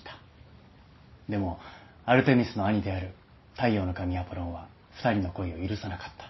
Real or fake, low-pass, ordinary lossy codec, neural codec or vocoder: real; 7.2 kHz; MP3, 24 kbps; none